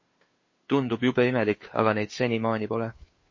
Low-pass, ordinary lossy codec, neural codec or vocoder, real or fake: 7.2 kHz; MP3, 32 kbps; codec, 16 kHz, 2 kbps, FunCodec, trained on Chinese and English, 25 frames a second; fake